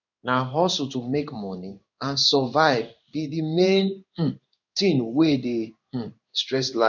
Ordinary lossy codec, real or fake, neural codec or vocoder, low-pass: none; fake; codec, 16 kHz in and 24 kHz out, 1 kbps, XY-Tokenizer; 7.2 kHz